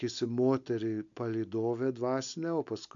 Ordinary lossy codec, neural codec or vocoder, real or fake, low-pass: MP3, 64 kbps; none; real; 7.2 kHz